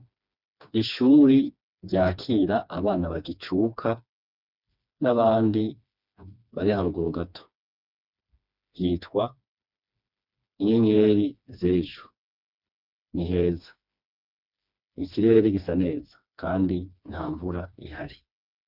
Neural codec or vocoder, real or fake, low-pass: codec, 16 kHz, 2 kbps, FreqCodec, smaller model; fake; 5.4 kHz